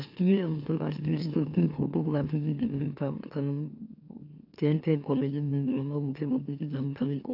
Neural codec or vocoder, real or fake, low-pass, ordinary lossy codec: autoencoder, 44.1 kHz, a latent of 192 numbers a frame, MeloTTS; fake; 5.4 kHz; AAC, 48 kbps